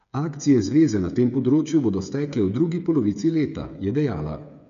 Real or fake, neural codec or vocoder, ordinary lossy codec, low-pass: fake; codec, 16 kHz, 8 kbps, FreqCodec, smaller model; none; 7.2 kHz